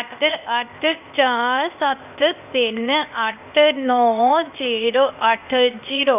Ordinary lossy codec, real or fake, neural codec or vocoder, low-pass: none; fake; codec, 16 kHz, 0.8 kbps, ZipCodec; 3.6 kHz